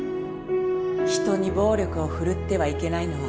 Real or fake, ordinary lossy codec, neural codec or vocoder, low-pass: real; none; none; none